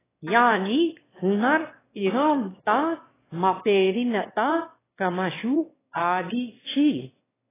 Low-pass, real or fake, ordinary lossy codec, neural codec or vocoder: 3.6 kHz; fake; AAC, 16 kbps; autoencoder, 22.05 kHz, a latent of 192 numbers a frame, VITS, trained on one speaker